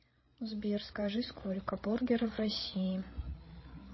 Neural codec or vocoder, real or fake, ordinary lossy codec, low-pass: codec, 16 kHz, 8 kbps, FreqCodec, larger model; fake; MP3, 24 kbps; 7.2 kHz